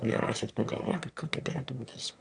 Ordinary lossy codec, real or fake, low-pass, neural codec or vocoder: AAC, 64 kbps; fake; 9.9 kHz; autoencoder, 22.05 kHz, a latent of 192 numbers a frame, VITS, trained on one speaker